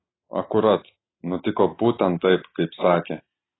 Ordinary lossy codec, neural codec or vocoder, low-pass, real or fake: AAC, 16 kbps; none; 7.2 kHz; real